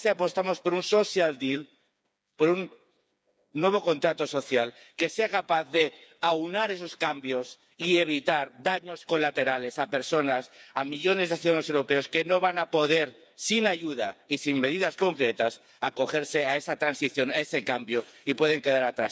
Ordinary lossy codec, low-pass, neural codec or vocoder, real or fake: none; none; codec, 16 kHz, 4 kbps, FreqCodec, smaller model; fake